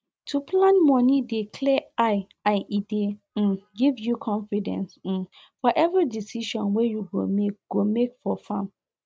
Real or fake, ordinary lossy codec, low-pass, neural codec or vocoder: real; none; none; none